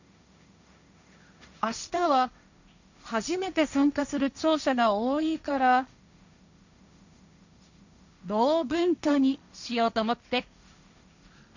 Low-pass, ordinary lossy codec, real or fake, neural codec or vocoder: 7.2 kHz; none; fake; codec, 16 kHz, 1.1 kbps, Voila-Tokenizer